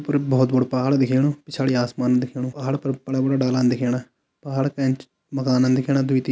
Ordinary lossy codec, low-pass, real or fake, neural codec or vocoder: none; none; real; none